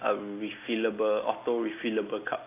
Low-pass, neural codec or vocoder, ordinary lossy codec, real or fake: 3.6 kHz; none; AAC, 24 kbps; real